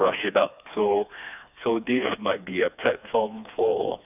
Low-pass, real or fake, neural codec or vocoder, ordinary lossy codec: 3.6 kHz; fake; codec, 16 kHz, 2 kbps, FreqCodec, smaller model; none